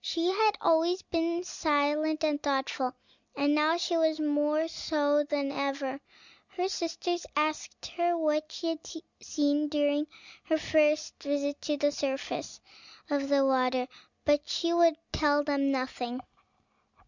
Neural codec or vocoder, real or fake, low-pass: none; real; 7.2 kHz